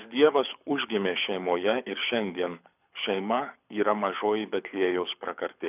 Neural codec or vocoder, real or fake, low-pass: codec, 24 kHz, 6 kbps, HILCodec; fake; 3.6 kHz